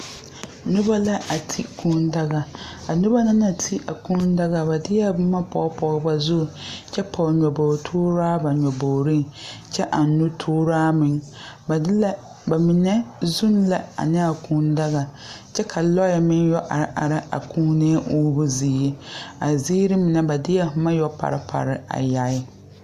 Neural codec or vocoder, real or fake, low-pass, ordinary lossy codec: none; real; 14.4 kHz; Opus, 64 kbps